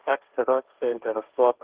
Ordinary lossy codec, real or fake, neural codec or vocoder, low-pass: Opus, 16 kbps; fake; codec, 16 kHz, 1.1 kbps, Voila-Tokenizer; 3.6 kHz